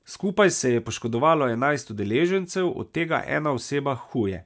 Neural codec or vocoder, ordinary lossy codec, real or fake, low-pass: none; none; real; none